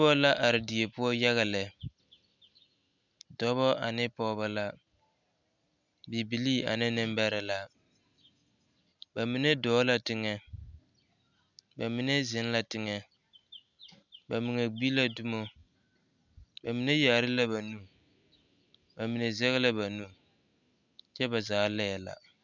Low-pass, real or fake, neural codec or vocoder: 7.2 kHz; real; none